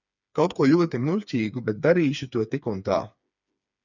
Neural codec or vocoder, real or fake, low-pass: codec, 16 kHz, 4 kbps, FreqCodec, smaller model; fake; 7.2 kHz